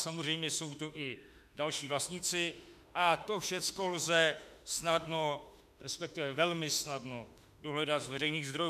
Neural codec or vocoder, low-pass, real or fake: autoencoder, 48 kHz, 32 numbers a frame, DAC-VAE, trained on Japanese speech; 14.4 kHz; fake